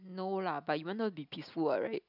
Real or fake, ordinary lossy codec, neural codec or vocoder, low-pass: real; none; none; 5.4 kHz